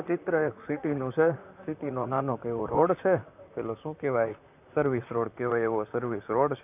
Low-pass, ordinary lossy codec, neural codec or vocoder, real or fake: 3.6 kHz; none; vocoder, 44.1 kHz, 128 mel bands, Pupu-Vocoder; fake